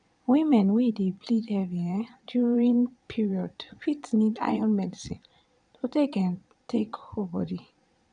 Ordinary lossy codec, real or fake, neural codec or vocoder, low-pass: MP3, 96 kbps; fake; vocoder, 22.05 kHz, 80 mel bands, Vocos; 9.9 kHz